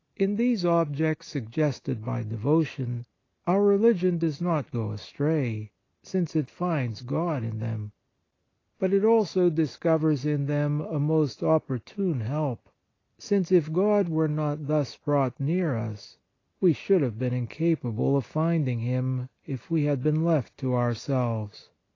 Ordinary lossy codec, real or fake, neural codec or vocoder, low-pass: AAC, 32 kbps; real; none; 7.2 kHz